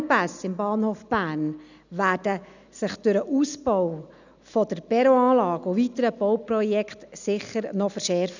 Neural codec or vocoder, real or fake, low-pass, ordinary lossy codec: none; real; 7.2 kHz; none